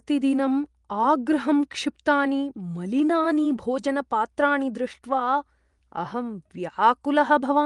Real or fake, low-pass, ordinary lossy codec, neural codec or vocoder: fake; 10.8 kHz; Opus, 32 kbps; vocoder, 24 kHz, 100 mel bands, Vocos